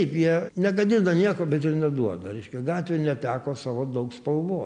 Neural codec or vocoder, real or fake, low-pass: none; real; 9.9 kHz